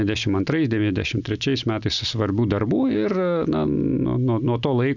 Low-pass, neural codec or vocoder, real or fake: 7.2 kHz; none; real